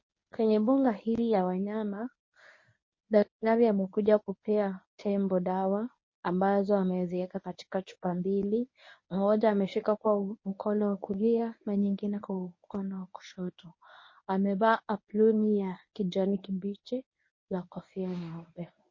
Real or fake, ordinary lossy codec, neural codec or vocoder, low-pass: fake; MP3, 32 kbps; codec, 24 kHz, 0.9 kbps, WavTokenizer, medium speech release version 1; 7.2 kHz